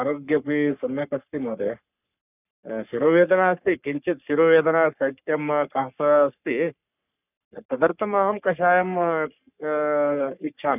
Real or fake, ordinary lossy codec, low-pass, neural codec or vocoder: fake; none; 3.6 kHz; codec, 44.1 kHz, 3.4 kbps, Pupu-Codec